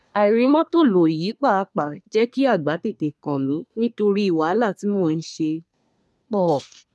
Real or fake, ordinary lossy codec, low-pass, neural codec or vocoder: fake; none; none; codec, 24 kHz, 1 kbps, SNAC